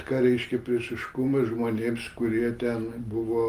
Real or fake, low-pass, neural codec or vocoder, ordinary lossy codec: real; 14.4 kHz; none; Opus, 24 kbps